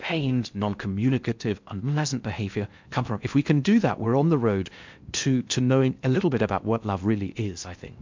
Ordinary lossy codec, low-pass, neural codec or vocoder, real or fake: MP3, 64 kbps; 7.2 kHz; codec, 16 kHz in and 24 kHz out, 0.6 kbps, FocalCodec, streaming, 4096 codes; fake